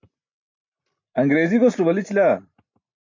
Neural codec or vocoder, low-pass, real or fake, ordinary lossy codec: none; 7.2 kHz; real; MP3, 48 kbps